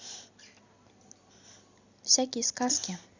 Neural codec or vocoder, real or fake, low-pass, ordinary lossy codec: none; real; 7.2 kHz; Opus, 64 kbps